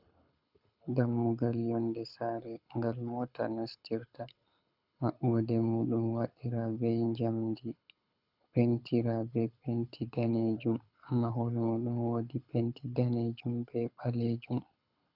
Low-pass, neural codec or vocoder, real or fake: 5.4 kHz; codec, 24 kHz, 6 kbps, HILCodec; fake